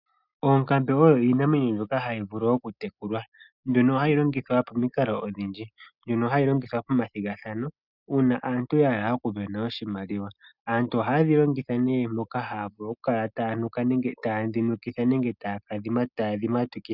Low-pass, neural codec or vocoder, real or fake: 5.4 kHz; none; real